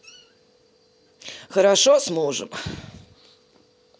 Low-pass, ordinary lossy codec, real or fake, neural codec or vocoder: none; none; real; none